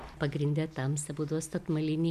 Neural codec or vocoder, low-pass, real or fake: none; 14.4 kHz; real